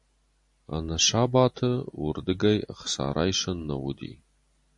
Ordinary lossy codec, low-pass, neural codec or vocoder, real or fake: MP3, 48 kbps; 10.8 kHz; none; real